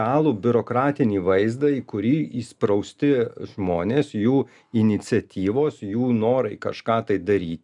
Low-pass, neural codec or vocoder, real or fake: 10.8 kHz; none; real